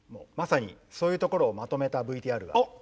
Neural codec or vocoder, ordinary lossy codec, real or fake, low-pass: none; none; real; none